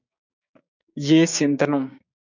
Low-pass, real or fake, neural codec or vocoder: 7.2 kHz; fake; codec, 16 kHz, 6 kbps, DAC